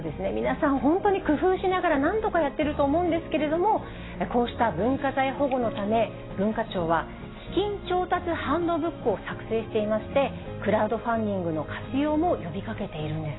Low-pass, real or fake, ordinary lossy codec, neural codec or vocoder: 7.2 kHz; real; AAC, 16 kbps; none